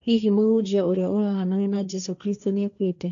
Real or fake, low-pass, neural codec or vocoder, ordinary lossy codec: fake; 7.2 kHz; codec, 16 kHz, 1.1 kbps, Voila-Tokenizer; none